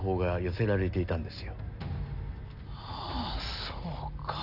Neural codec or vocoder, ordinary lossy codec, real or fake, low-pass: none; none; real; 5.4 kHz